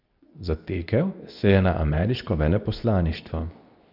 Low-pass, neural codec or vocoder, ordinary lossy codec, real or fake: 5.4 kHz; codec, 24 kHz, 0.9 kbps, WavTokenizer, medium speech release version 2; none; fake